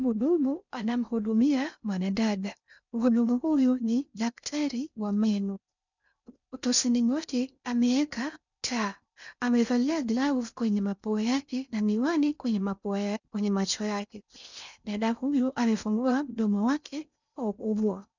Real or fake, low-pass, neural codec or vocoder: fake; 7.2 kHz; codec, 16 kHz in and 24 kHz out, 0.6 kbps, FocalCodec, streaming, 2048 codes